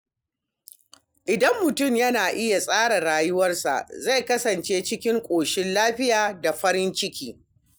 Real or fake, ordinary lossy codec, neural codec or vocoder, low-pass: real; none; none; none